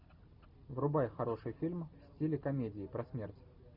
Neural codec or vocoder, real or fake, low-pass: none; real; 5.4 kHz